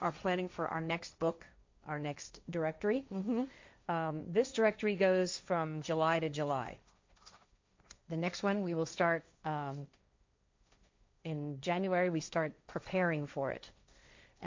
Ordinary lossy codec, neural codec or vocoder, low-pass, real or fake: MP3, 64 kbps; codec, 16 kHz, 1.1 kbps, Voila-Tokenizer; 7.2 kHz; fake